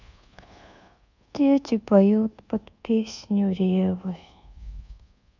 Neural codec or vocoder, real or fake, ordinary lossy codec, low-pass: codec, 24 kHz, 1.2 kbps, DualCodec; fake; none; 7.2 kHz